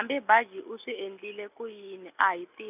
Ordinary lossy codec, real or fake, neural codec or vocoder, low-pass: none; real; none; 3.6 kHz